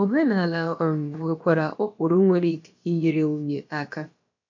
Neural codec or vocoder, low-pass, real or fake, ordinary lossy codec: codec, 16 kHz, about 1 kbps, DyCAST, with the encoder's durations; 7.2 kHz; fake; MP3, 48 kbps